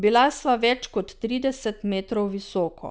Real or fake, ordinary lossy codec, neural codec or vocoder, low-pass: real; none; none; none